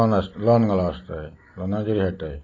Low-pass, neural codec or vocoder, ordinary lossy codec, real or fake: 7.2 kHz; none; AAC, 32 kbps; real